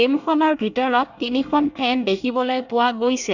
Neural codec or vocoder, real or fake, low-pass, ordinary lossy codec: codec, 24 kHz, 1 kbps, SNAC; fake; 7.2 kHz; none